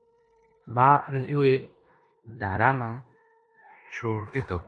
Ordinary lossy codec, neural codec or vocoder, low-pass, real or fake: AAC, 64 kbps; codec, 16 kHz in and 24 kHz out, 0.9 kbps, LongCat-Audio-Codec, four codebook decoder; 10.8 kHz; fake